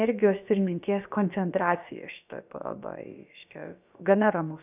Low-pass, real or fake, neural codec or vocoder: 3.6 kHz; fake; codec, 16 kHz, about 1 kbps, DyCAST, with the encoder's durations